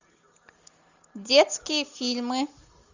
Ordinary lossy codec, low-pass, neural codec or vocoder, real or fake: Opus, 64 kbps; 7.2 kHz; none; real